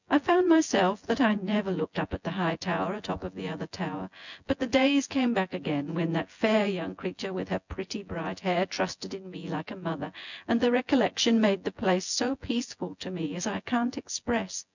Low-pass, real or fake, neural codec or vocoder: 7.2 kHz; fake; vocoder, 24 kHz, 100 mel bands, Vocos